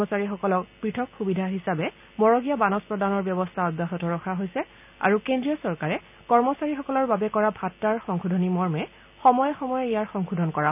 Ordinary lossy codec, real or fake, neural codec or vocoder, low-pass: none; real; none; 3.6 kHz